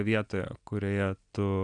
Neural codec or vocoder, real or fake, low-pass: none; real; 9.9 kHz